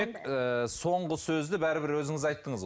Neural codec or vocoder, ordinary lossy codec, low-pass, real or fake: none; none; none; real